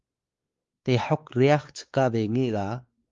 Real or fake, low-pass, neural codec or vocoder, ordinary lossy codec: fake; 7.2 kHz; codec, 16 kHz, 4 kbps, X-Codec, WavLM features, trained on Multilingual LibriSpeech; Opus, 32 kbps